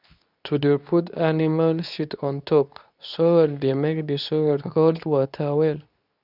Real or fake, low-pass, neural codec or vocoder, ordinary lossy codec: fake; 5.4 kHz; codec, 24 kHz, 0.9 kbps, WavTokenizer, medium speech release version 2; none